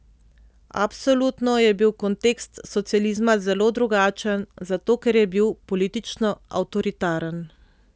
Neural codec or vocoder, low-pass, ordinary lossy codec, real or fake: none; none; none; real